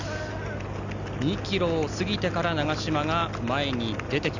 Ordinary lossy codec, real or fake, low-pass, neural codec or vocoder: Opus, 64 kbps; real; 7.2 kHz; none